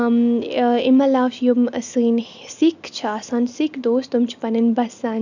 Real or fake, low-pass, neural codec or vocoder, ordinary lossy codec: real; 7.2 kHz; none; none